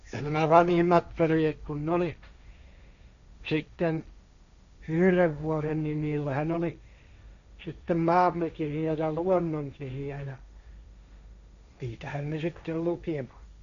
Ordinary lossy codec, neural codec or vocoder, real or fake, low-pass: none; codec, 16 kHz, 1.1 kbps, Voila-Tokenizer; fake; 7.2 kHz